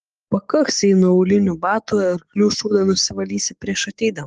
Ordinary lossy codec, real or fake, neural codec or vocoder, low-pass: Opus, 24 kbps; fake; codec, 44.1 kHz, 7.8 kbps, DAC; 10.8 kHz